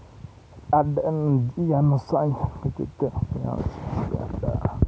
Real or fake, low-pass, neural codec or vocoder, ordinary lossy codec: real; none; none; none